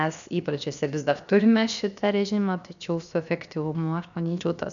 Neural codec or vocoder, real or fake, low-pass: codec, 16 kHz, 0.7 kbps, FocalCodec; fake; 7.2 kHz